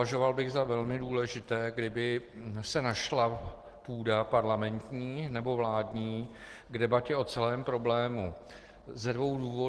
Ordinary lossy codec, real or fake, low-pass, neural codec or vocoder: Opus, 16 kbps; real; 10.8 kHz; none